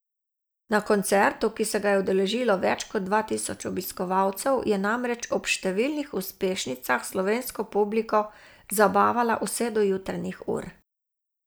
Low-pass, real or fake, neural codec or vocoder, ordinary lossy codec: none; real; none; none